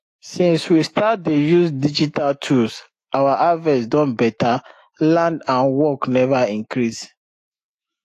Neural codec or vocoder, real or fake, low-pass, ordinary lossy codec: autoencoder, 48 kHz, 128 numbers a frame, DAC-VAE, trained on Japanese speech; fake; 14.4 kHz; AAC, 48 kbps